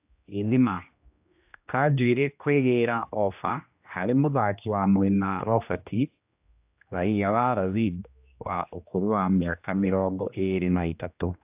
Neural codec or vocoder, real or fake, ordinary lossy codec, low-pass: codec, 16 kHz, 1 kbps, X-Codec, HuBERT features, trained on general audio; fake; AAC, 32 kbps; 3.6 kHz